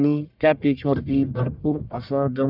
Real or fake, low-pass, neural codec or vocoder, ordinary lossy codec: fake; 5.4 kHz; codec, 44.1 kHz, 1.7 kbps, Pupu-Codec; none